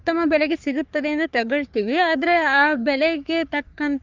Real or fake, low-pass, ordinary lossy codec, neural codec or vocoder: fake; 7.2 kHz; Opus, 32 kbps; codec, 44.1 kHz, 3.4 kbps, Pupu-Codec